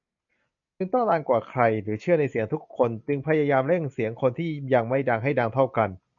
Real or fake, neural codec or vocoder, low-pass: real; none; 7.2 kHz